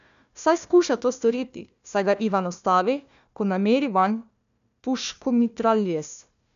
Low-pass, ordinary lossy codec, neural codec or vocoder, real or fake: 7.2 kHz; MP3, 96 kbps; codec, 16 kHz, 1 kbps, FunCodec, trained on Chinese and English, 50 frames a second; fake